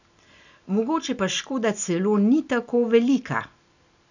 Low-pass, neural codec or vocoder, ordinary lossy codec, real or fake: 7.2 kHz; none; none; real